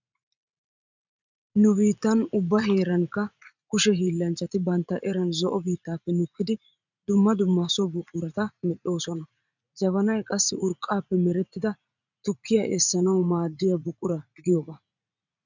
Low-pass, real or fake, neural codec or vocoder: 7.2 kHz; fake; vocoder, 44.1 kHz, 80 mel bands, Vocos